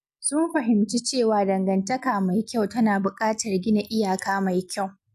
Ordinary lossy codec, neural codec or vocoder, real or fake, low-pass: none; none; real; 14.4 kHz